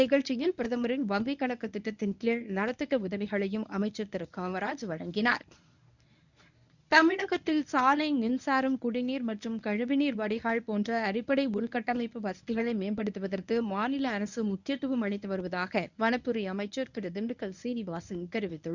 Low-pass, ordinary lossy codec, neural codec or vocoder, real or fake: 7.2 kHz; none; codec, 24 kHz, 0.9 kbps, WavTokenizer, medium speech release version 1; fake